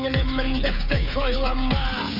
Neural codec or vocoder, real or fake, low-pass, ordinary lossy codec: codec, 16 kHz, 16 kbps, FreqCodec, smaller model; fake; 5.4 kHz; none